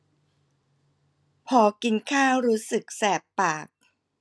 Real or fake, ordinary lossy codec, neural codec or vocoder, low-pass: real; none; none; none